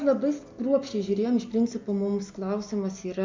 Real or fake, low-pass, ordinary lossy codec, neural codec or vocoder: real; 7.2 kHz; MP3, 48 kbps; none